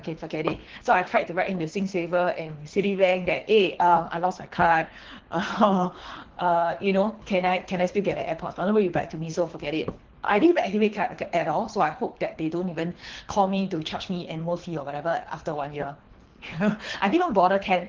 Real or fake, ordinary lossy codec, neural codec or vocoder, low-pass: fake; Opus, 16 kbps; codec, 24 kHz, 3 kbps, HILCodec; 7.2 kHz